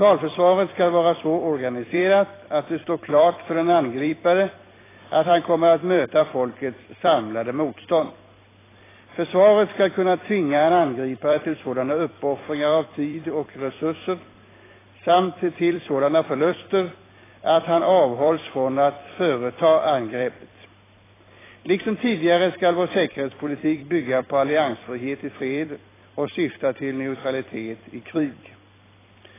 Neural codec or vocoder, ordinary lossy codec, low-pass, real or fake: vocoder, 44.1 kHz, 128 mel bands every 256 samples, BigVGAN v2; AAC, 16 kbps; 3.6 kHz; fake